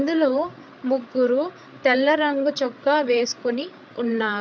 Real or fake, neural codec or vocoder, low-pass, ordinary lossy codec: fake; codec, 16 kHz, 8 kbps, FreqCodec, larger model; none; none